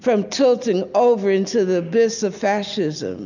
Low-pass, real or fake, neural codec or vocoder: 7.2 kHz; real; none